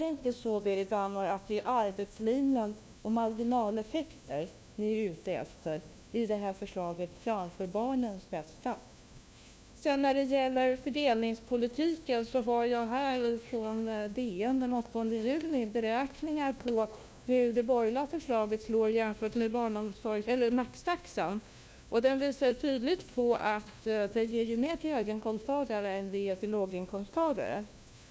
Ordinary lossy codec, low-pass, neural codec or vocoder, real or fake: none; none; codec, 16 kHz, 1 kbps, FunCodec, trained on LibriTTS, 50 frames a second; fake